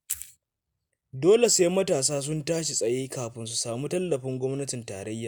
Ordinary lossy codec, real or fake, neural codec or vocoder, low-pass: none; real; none; none